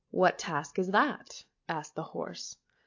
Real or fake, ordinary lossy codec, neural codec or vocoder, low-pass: real; AAC, 48 kbps; none; 7.2 kHz